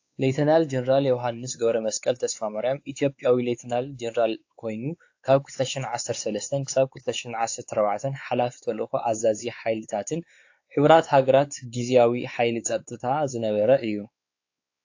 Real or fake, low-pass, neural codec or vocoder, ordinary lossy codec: fake; 7.2 kHz; codec, 16 kHz, 4 kbps, X-Codec, WavLM features, trained on Multilingual LibriSpeech; AAC, 48 kbps